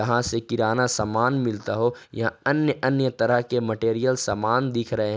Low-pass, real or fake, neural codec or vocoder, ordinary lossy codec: none; real; none; none